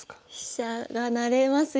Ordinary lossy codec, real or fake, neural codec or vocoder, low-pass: none; real; none; none